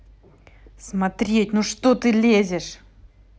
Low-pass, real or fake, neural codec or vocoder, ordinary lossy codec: none; real; none; none